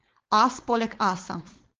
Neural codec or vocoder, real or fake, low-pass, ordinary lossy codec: codec, 16 kHz, 4.8 kbps, FACodec; fake; 7.2 kHz; Opus, 16 kbps